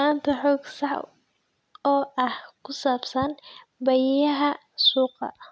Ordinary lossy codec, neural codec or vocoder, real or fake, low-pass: none; none; real; none